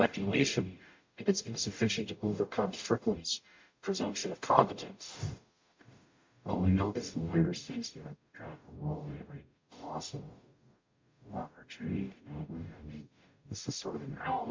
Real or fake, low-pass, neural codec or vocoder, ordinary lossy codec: fake; 7.2 kHz; codec, 44.1 kHz, 0.9 kbps, DAC; MP3, 48 kbps